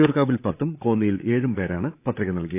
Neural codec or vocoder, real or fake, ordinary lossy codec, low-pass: codec, 16 kHz, 8 kbps, FreqCodec, larger model; fake; AAC, 24 kbps; 3.6 kHz